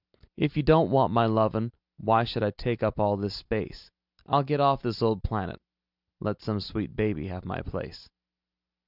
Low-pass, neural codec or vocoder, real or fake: 5.4 kHz; none; real